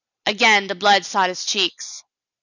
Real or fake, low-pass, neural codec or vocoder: real; 7.2 kHz; none